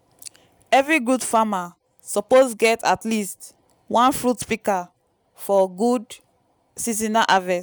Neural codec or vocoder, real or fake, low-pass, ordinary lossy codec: none; real; none; none